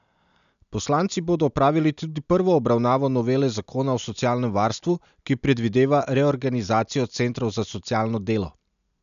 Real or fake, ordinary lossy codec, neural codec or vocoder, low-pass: real; none; none; 7.2 kHz